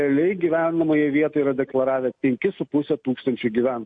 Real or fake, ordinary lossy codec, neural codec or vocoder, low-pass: real; MP3, 48 kbps; none; 9.9 kHz